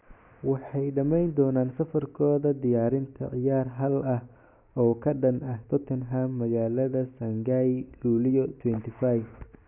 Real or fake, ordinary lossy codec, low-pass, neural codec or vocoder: real; none; 3.6 kHz; none